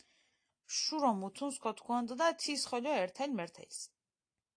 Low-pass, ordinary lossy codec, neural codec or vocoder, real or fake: 9.9 kHz; AAC, 48 kbps; none; real